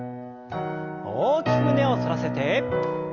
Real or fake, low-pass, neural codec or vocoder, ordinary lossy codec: real; 7.2 kHz; none; Opus, 32 kbps